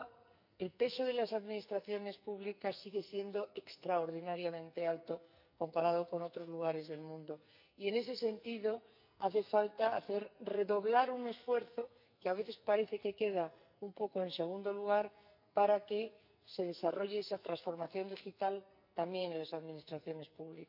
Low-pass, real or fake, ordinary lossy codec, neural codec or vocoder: 5.4 kHz; fake; none; codec, 44.1 kHz, 2.6 kbps, SNAC